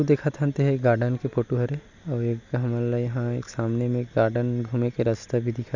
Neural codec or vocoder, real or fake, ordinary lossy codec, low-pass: none; real; none; 7.2 kHz